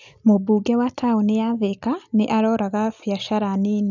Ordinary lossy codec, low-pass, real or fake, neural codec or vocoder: none; 7.2 kHz; real; none